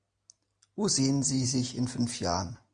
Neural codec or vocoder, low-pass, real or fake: none; 9.9 kHz; real